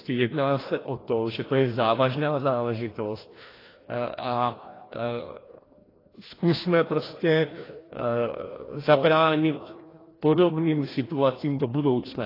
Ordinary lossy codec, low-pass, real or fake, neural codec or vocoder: AAC, 24 kbps; 5.4 kHz; fake; codec, 16 kHz, 1 kbps, FreqCodec, larger model